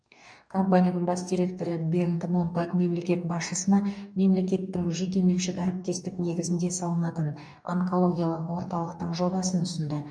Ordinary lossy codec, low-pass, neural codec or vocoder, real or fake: none; 9.9 kHz; codec, 44.1 kHz, 2.6 kbps, DAC; fake